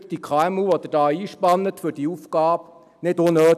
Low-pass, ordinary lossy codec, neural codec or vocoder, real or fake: 14.4 kHz; none; none; real